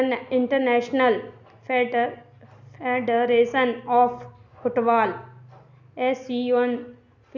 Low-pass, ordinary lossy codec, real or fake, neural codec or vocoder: 7.2 kHz; none; real; none